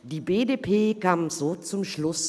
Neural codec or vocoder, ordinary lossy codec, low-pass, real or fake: none; none; none; real